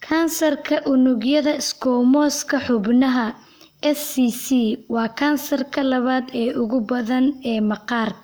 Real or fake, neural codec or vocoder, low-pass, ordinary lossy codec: fake; codec, 44.1 kHz, 7.8 kbps, Pupu-Codec; none; none